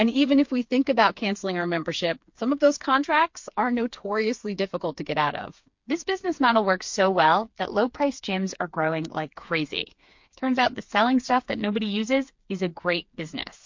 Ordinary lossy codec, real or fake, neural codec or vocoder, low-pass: MP3, 48 kbps; fake; codec, 16 kHz, 4 kbps, FreqCodec, smaller model; 7.2 kHz